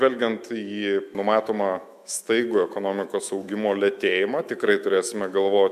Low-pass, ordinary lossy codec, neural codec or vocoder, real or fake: 14.4 kHz; MP3, 96 kbps; autoencoder, 48 kHz, 128 numbers a frame, DAC-VAE, trained on Japanese speech; fake